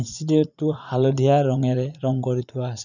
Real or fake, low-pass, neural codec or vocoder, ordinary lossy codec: fake; 7.2 kHz; vocoder, 44.1 kHz, 80 mel bands, Vocos; none